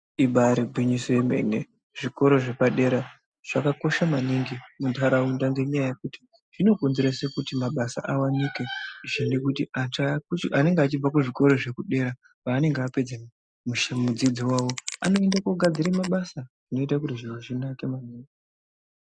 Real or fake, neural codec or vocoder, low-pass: real; none; 9.9 kHz